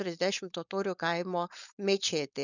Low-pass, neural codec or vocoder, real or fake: 7.2 kHz; codec, 16 kHz, 4.8 kbps, FACodec; fake